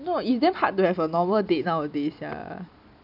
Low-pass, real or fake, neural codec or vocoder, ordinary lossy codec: 5.4 kHz; real; none; none